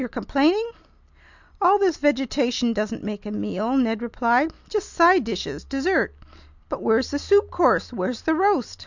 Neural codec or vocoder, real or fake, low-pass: none; real; 7.2 kHz